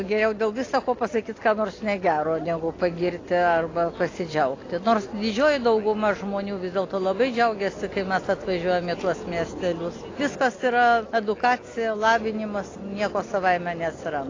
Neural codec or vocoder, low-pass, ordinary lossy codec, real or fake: none; 7.2 kHz; AAC, 32 kbps; real